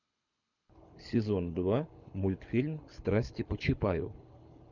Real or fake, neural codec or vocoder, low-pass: fake; codec, 24 kHz, 6 kbps, HILCodec; 7.2 kHz